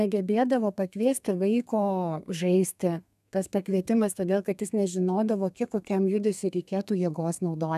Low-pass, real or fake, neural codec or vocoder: 14.4 kHz; fake; codec, 32 kHz, 1.9 kbps, SNAC